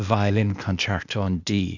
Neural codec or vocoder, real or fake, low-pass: codec, 16 kHz, 0.8 kbps, ZipCodec; fake; 7.2 kHz